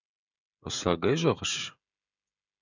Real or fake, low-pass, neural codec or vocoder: fake; 7.2 kHz; codec, 16 kHz, 16 kbps, FreqCodec, smaller model